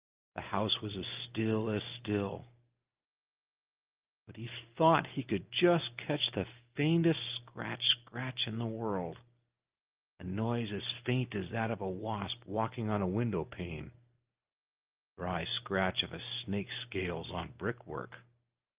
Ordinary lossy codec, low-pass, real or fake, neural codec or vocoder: Opus, 24 kbps; 3.6 kHz; real; none